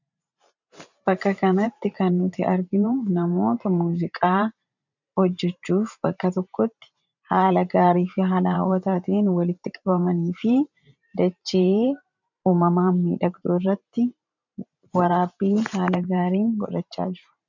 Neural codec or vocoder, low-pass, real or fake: vocoder, 44.1 kHz, 128 mel bands every 512 samples, BigVGAN v2; 7.2 kHz; fake